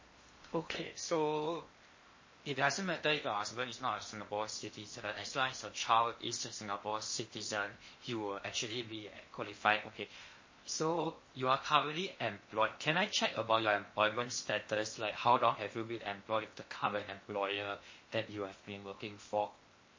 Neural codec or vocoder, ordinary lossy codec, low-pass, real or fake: codec, 16 kHz in and 24 kHz out, 0.8 kbps, FocalCodec, streaming, 65536 codes; MP3, 32 kbps; 7.2 kHz; fake